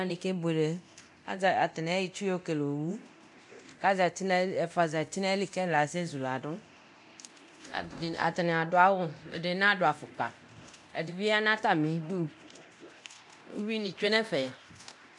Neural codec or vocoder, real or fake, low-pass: codec, 24 kHz, 0.9 kbps, DualCodec; fake; 10.8 kHz